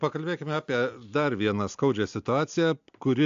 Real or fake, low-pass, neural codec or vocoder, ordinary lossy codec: real; 7.2 kHz; none; AAC, 64 kbps